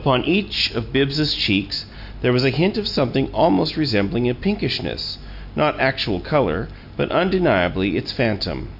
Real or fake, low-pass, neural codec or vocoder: real; 5.4 kHz; none